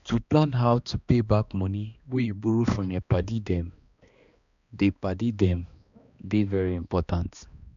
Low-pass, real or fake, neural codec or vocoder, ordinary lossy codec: 7.2 kHz; fake; codec, 16 kHz, 2 kbps, X-Codec, HuBERT features, trained on balanced general audio; none